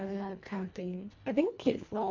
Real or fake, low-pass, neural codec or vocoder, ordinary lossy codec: fake; 7.2 kHz; codec, 24 kHz, 1.5 kbps, HILCodec; MP3, 48 kbps